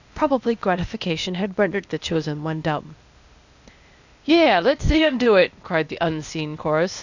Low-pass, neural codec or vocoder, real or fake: 7.2 kHz; codec, 16 kHz, 0.8 kbps, ZipCodec; fake